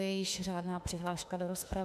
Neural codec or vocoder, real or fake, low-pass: autoencoder, 48 kHz, 32 numbers a frame, DAC-VAE, trained on Japanese speech; fake; 14.4 kHz